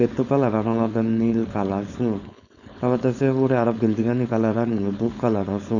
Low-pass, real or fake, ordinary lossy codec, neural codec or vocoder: 7.2 kHz; fake; none; codec, 16 kHz, 4.8 kbps, FACodec